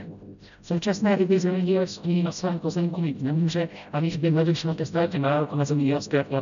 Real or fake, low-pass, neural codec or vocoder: fake; 7.2 kHz; codec, 16 kHz, 0.5 kbps, FreqCodec, smaller model